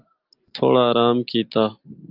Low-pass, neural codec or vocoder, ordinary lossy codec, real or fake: 5.4 kHz; none; Opus, 32 kbps; real